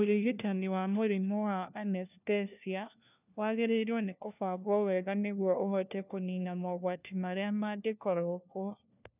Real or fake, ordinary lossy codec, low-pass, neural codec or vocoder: fake; none; 3.6 kHz; codec, 16 kHz, 1 kbps, FunCodec, trained on LibriTTS, 50 frames a second